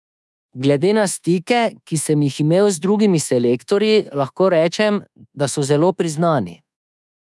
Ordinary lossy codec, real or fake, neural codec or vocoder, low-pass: none; fake; codec, 24 kHz, 1.2 kbps, DualCodec; none